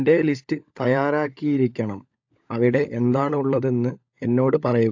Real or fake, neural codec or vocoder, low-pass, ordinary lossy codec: fake; codec, 16 kHz, 16 kbps, FunCodec, trained on LibriTTS, 50 frames a second; 7.2 kHz; AAC, 48 kbps